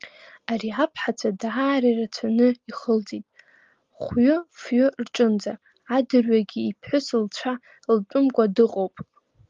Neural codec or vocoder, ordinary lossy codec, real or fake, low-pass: none; Opus, 32 kbps; real; 7.2 kHz